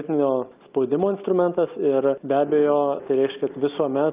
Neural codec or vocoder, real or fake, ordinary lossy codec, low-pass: none; real; Opus, 32 kbps; 3.6 kHz